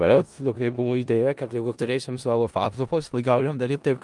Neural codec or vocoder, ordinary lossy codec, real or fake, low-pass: codec, 16 kHz in and 24 kHz out, 0.4 kbps, LongCat-Audio-Codec, four codebook decoder; Opus, 32 kbps; fake; 10.8 kHz